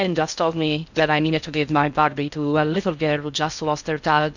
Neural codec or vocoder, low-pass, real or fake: codec, 16 kHz in and 24 kHz out, 0.6 kbps, FocalCodec, streaming, 2048 codes; 7.2 kHz; fake